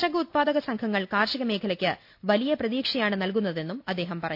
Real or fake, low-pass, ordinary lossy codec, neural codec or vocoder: real; 5.4 kHz; AAC, 48 kbps; none